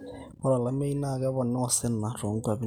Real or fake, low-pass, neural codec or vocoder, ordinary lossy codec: real; none; none; none